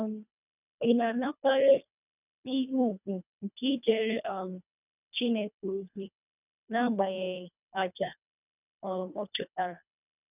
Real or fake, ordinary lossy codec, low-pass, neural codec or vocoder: fake; none; 3.6 kHz; codec, 24 kHz, 1.5 kbps, HILCodec